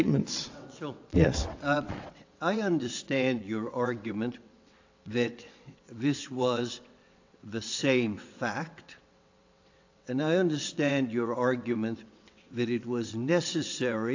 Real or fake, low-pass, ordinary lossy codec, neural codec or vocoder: fake; 7.2 kHz; AAC, 48 kbps; vocoder, 22.05 kHz, 80 mel bands, WaveNeXt